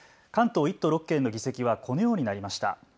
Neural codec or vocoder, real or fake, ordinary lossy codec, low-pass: none; real; none; none